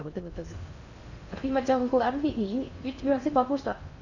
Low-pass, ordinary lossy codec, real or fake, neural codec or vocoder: 7.2 kHz; none; fake; codec, 16 kHz in and 24 kHz out, 0.6 kbps, FocalCodec, streaming, 4096 codes